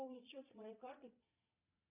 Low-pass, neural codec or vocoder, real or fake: 3.6 kHz; vocoder, 22.05 kHz, 80 mel bands, Vocos; fake